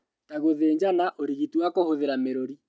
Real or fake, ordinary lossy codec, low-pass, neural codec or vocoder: real; none; none; none